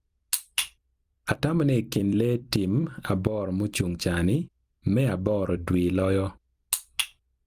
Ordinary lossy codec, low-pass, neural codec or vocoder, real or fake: Opus, 32 kbps; 14.4 kHz; vocoder, 44.1 kHz, 128 mel bands every 256 samples, BigVGAN v2; fake